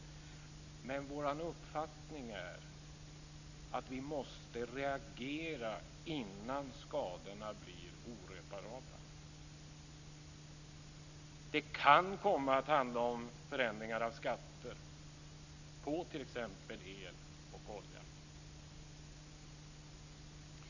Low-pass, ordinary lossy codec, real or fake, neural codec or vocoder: 7.2 kHz; none; real; none